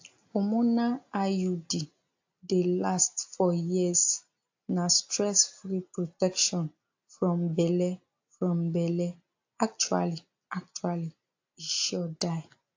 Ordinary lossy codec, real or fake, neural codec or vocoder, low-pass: AAC, 48 kbps; real; none; 7.2 kHz